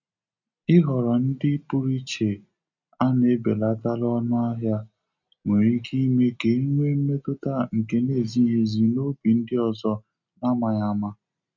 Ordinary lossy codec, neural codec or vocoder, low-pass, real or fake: none; none; 7.2 kHz; real